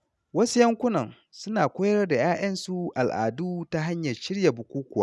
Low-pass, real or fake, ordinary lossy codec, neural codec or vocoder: none; real; none; none